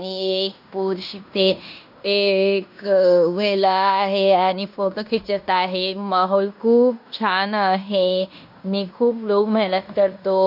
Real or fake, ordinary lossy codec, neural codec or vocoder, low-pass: fake; none; codec, 16 kHz in and 24 kHz out, 0.9 kbps, LongCat-Audio-Codec, fine tuned four codebook decoder; 5.4 kHz